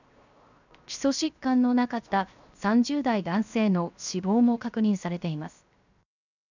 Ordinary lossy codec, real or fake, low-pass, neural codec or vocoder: none; fake; 7.2 kHz; codec, 16 kHz, 0.7 kbps, FocalCodec